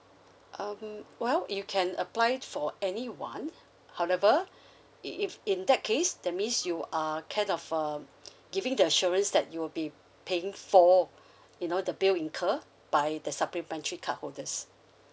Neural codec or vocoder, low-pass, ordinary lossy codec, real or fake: none; none; none; real